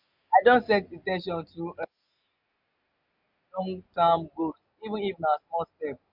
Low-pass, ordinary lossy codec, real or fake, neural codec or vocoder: 5.4 kHz; MP3, 48 kbps; real; none